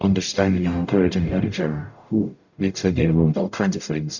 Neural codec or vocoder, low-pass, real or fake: codec, 44.1 kHz, 0.9 kbps, DAC; 7.2 kHz; fake